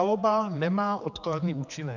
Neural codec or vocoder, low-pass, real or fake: codec, 16 kHz, 4 kbps, X-Codec, HuBERT features, trained on general audio; 7.2 kHz; fake